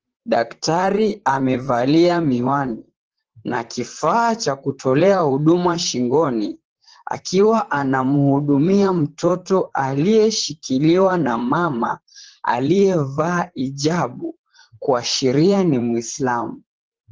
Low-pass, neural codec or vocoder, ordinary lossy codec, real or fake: 7.2 kHz; vocoder, 44.1 kHz, 128 mel bands, Pupu-Vocoder; Opus, 16 kbps; fake